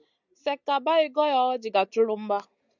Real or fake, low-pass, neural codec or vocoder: real; 7.2 kHz; none